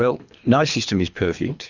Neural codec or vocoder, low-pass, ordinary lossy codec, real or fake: codec, 24 kHz, 3 kbps, HILCodec; 7.2 kHz; Opus, 64 kbps; fake